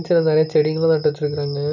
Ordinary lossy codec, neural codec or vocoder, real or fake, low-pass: none; none; real; 7.2 kHz